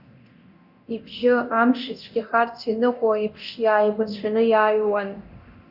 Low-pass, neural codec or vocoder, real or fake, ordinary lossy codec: 5.4 kHz; codec, 24 kHz, 0.9 kbps, DualCodec; fake; Opus, 64 kbps